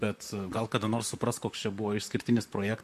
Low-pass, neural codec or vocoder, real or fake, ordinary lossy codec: 14.4 kHz; vocoder, 44.1 kHz, 128 mel bands, Pupu-Vocoder; fake; MP3, 64 kbps